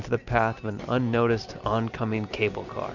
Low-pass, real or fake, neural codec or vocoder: 7.2 kHz; real; none